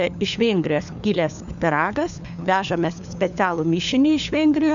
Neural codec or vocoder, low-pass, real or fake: codec, 16 kHz, 4 kbps, FunCodec, trained on LibriTTS, 50 frames a second; 7.2 kHz; fake